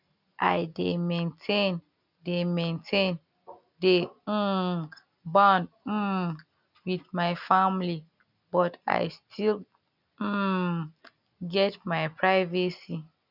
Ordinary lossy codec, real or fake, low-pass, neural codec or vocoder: none; real; 5.4 kHz; none